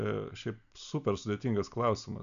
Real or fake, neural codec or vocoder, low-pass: real; none; 7.2 kHz